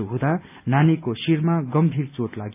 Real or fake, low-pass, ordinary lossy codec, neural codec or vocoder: real; 3.6 kHz; AAC, 32 kbps; none